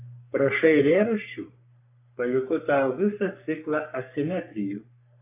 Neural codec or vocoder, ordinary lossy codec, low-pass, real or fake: codec, 44.1 kHz, 3.4 kbps, Pupu-Codec; AAC, 32 kbps; 3.6 kHz; fake